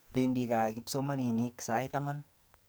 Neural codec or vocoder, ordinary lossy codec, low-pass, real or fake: codec, 44.1 kHz, 2.6 kbps, SNAC; none; none; fake